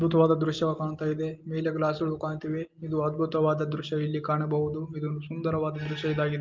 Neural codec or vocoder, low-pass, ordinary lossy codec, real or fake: none; 7.2 kHz; Opus, 32 kbps; real